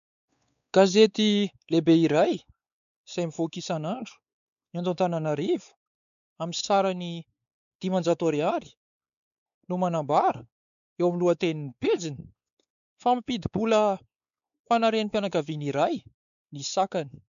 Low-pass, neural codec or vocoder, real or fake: 7.2 kHz; codec, 16 kHz, 4 kbps, X-Codec, WavLM features, trained on Multilingual LibriSpeech; fake